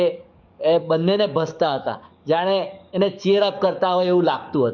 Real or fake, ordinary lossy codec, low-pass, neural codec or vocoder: fake; none; 7.2 kHz; codec, 44.1 kHz, 7.8 kbps, DAC